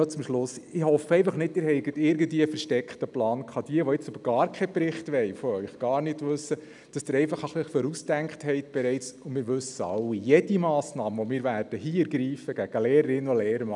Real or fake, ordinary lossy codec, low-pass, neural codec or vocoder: real; none; 10.8 kHz; none